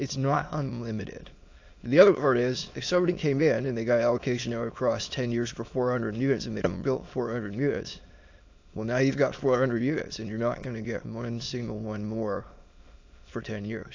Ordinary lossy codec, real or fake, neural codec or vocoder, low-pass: AAC, 48 kbps; fake; autoencoder, 22.05 kHz, a latent of 192 numbers a frame, VITS, trained on many speakers; 7.2 kHz